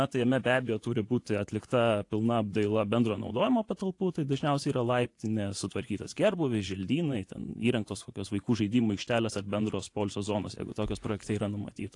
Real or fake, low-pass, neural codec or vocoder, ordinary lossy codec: fake; 10.8 kHz; vocoder, 24 kHz, 100 mel bands, Vocos; AAC, 48 kbps